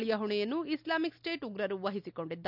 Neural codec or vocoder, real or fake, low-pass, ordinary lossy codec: none; real; 5.4 kHz; none